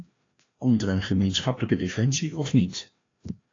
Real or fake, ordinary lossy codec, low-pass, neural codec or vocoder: fake; AAC, 32 kbps; 7.2 kHz; codec, 16 kHz, 1 kbps, FreqCodec, larger model